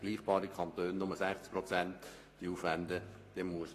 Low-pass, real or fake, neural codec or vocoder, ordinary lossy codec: 14.4 kHz; fake; codec, 44.1 kHz, 7.8 kbps, Pupu-Codec; AAC, 48 kbps